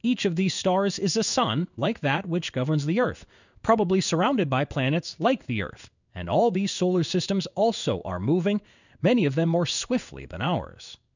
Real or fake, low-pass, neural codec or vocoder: fake; 7.2 kHz; codec, 16 kHz in and 24 kHz out, 1 kbps, XY-Tokenizer